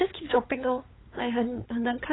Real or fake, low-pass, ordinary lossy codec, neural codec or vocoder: fake; 7.2 kHz; AAC, 16 kbps; codec, 16 kHz, 8 kbps, FunCodec, trained on LibriTTS, 25 frames a second